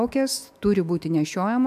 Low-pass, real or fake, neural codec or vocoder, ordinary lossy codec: 14.4 kHz; fake; autoencoder, 48 kHz, 128 numbers a frame, DAC-VAE, trained on Japanese speech; AAC, 96 kbps